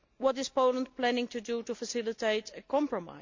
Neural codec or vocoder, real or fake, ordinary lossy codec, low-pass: none; real; MP3, 48 kbps; 7.2 kHz